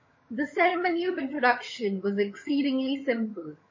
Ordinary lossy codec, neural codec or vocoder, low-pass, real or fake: MP3, 32 kbps; vocoder, 22.05 kHz, 80 mel bands, HiFi-GAN; 7.2 kHz; fake